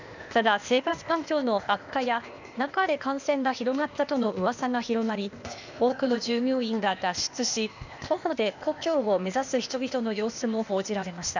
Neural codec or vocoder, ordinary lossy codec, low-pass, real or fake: codec, 16 kHz, 0.8 kbps, ZipCodec; none; 7.2 kHz; fake